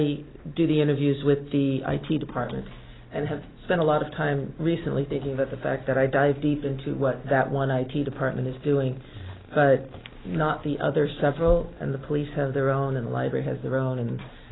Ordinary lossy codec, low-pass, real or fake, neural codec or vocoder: AAC, 16 kbps; 7.2 kHz; real; none